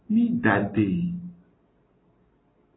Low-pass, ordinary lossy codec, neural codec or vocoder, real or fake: 7.2 kHz; AAC, 16 kbps; none; real